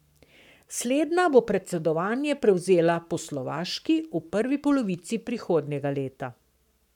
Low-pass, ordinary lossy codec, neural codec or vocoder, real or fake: 19.8 kHz; none; codec, 44.1 kHz, 7.8 kbps, Pupu-Codec; fake